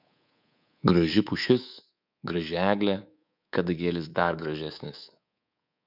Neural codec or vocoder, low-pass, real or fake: codec, 24 kHz, 3.1 kbps, DualCodec; 5.4 kHz; fake